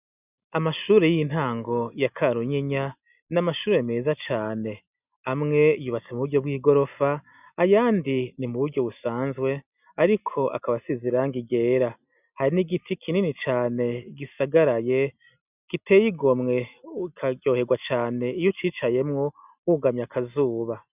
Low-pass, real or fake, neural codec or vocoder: 3.6 kHz; real; none